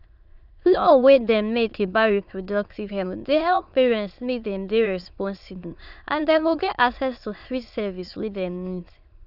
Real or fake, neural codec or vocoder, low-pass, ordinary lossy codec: fake; autoencoder, 22.05 kHz, a latent of 192 numbers a frame, VITS, trained on many speakers; 5.4 kHz; none